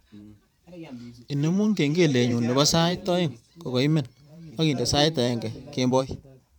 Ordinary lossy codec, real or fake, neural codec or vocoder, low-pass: none; fake; vocoder, 44.1 kHz, 128 mel bands every 512 samples, BigVGAN v2; 19.8 kHz